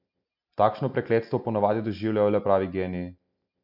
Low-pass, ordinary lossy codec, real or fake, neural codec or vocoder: 5.4 kHz; none; real; none